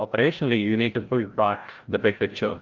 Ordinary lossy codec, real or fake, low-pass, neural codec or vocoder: Opus, 32 kbps; fake; 7.2 kHz; codec, 16 kHz, 0.5 kbps, FreqCodec, larger model